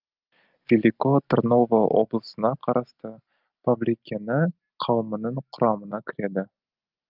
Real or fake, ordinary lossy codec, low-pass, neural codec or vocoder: real; Opus, 32 kbps; 5.4 kHz; none